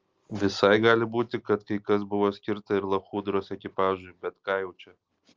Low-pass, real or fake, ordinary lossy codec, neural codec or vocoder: 7.2 kHz; real; Opus, 32 kbps; none